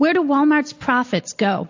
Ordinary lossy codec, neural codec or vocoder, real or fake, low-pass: AAC, 48 kbps; none; real; 7.2 kHz